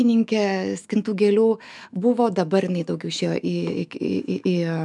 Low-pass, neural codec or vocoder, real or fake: 10.8 kHz; autoencoder, 48 kHz, 128 numbers a frame, DAC-VAE, trained on Japanese speech; fake